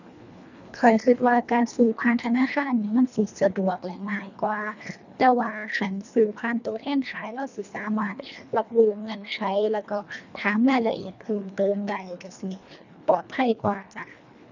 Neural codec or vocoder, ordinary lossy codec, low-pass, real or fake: codec, 24 kHz, 1.5 kbps, HILCodec; none; 7.2 kHz; fake